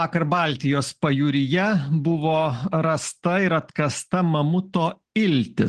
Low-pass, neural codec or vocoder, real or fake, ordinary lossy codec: 9.9 kHz; none; real; Opus, 16 kbps